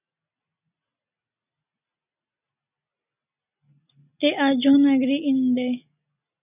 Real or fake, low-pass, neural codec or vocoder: real; 3.6 kHz; none